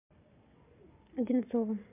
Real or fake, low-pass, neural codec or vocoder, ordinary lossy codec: fake; 3.6 kHz; codec, 44.1 kHz, 7.8 kbps, Pupu-Codec; MP3, 32 kbps